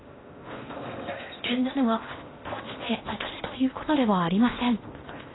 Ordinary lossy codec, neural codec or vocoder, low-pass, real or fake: AAC, 16 kbps; codec, 16 kHz in and 24 kHz out, 0.6 kbps, FocalCodec, streaming, 4096 codes; 7.2 kHz; fake